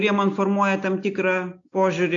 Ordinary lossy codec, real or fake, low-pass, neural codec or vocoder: AAC, 48 kbps; real; 7.2 kHz; none